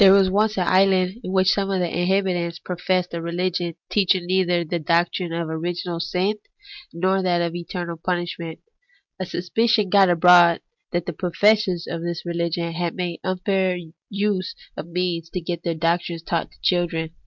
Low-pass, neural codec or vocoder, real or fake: 7.2 kHz; none; real